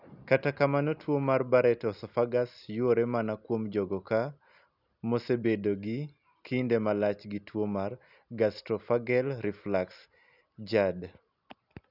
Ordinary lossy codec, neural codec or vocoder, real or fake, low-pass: none; none; real; 5.4 kHz